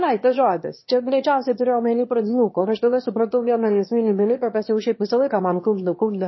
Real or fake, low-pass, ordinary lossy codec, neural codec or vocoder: fake; 7.2 kHz; MP3, 24 kbps; autoencoder, 22.05 kHz, a latent of 192 numbers a frame, VITS, trained on one speaker